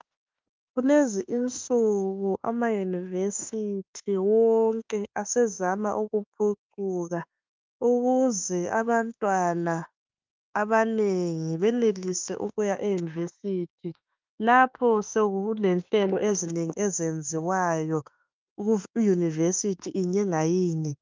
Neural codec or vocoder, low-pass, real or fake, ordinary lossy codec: autoencoder, 48 kHz, 32 numbers a frame, DAC-VAE, trained on Japanese speech; 7.2 kHz; fake; Opus, 24 kbps